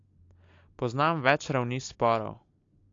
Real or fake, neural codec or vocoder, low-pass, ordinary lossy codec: real; none; 7.2 kHz; AAC, 48 kbps